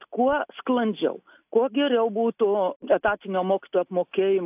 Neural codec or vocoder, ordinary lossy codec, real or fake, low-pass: none; AAC, 32 kbps; real; 3.6 kHz